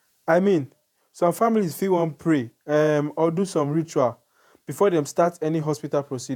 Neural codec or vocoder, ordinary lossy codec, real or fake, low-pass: vocoder, 48 kHz, 128 mel bands, Vocos; none; fake; 19.8 kHz